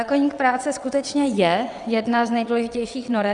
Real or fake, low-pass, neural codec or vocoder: fake; 9.9 kHz; vocoder, 22.05 kHz, 80 mel bands, WaveNeXt